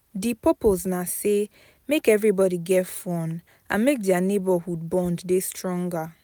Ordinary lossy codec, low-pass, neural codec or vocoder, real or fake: none; none; none; real